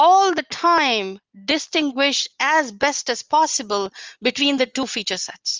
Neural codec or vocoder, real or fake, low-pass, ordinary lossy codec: none; real; 7.2 kHz; Opus, 32 kbps